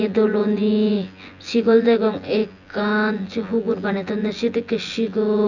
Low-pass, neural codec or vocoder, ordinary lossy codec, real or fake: 7.2 kHz; vocoder, 24 kHz, 100 mel bands, Vocos; none; fake